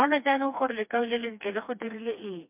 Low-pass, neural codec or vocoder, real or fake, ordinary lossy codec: 3.6 kHz; codec, 16 kHz, 2 kbps, FreqCodec, smaller model; fake; MP3, 32 kbps